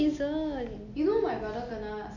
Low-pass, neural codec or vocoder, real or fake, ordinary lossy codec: 7.2 kHz; none; real; none